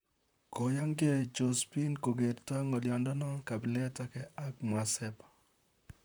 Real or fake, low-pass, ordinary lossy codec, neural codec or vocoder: fake; none; none; vocoder, 44.1 kHz, 128 mel bands, Pupu-Vocoder